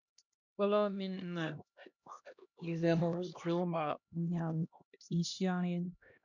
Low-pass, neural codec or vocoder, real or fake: 7.2 kHz; codec, 16 kHz, 1 kbps, X-Codec, HuBERT features, trained on LibriSpeech; fake